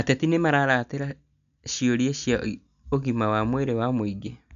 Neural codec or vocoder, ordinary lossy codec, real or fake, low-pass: none; none; real; 7.2 kHz